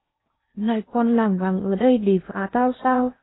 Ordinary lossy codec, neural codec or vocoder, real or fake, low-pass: AAC, 16 kbps; codec, 16 kHz in and 24 kHz out, 0.6 kbps, FocalCodec, streaming, 2048 codes; fake; 7.2 kHz